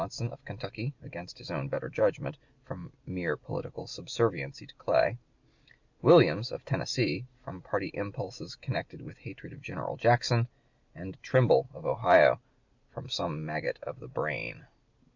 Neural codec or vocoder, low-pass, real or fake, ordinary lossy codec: none; 7.2 kHz; real; MP3, 64 kbps